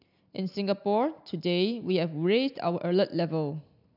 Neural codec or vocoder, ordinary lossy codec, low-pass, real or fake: none; none; 5.4 kHz; real